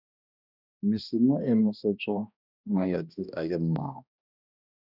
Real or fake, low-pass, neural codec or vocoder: fake; 5.4 kHz; codec, 16 kHz, 1 kbps, X-Codec, HuBERT features, trained on balanced general audio